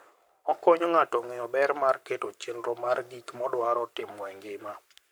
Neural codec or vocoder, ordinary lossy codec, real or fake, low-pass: codec, 44.1 kHz, 7.8 kbps, Pupu-Codec; none; fake; none